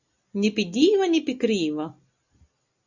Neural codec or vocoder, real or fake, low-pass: none; real; 7.2 kHz